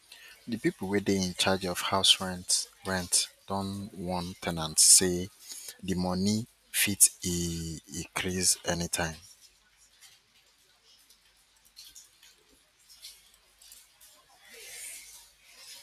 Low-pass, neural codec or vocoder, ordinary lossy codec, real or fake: 14.4 kHz; none; none; real